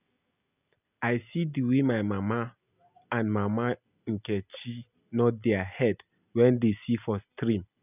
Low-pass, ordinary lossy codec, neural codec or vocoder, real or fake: 3.6 kHz; none; none; real